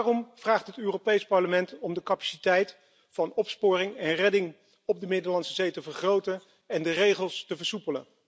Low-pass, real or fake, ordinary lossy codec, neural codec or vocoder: none; real; none; none